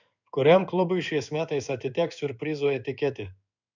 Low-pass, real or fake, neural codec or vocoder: 7.2 kHz; fake; codec, 16 kHz in and 24 kHz out, 1 kbps, XY-Tokenizer